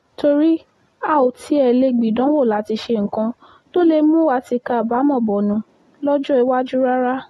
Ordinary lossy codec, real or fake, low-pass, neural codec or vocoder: AAC, 32 kbps; real; 14.4 kHz; none